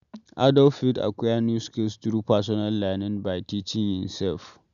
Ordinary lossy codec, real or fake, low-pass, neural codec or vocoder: none; real; 7.2 kHz; none